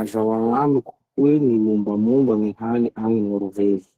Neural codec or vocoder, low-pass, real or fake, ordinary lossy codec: codec, 32 kHz, 1.9 kbps, SNAC; 14.4 kHz; fake; Opus, 16 kbps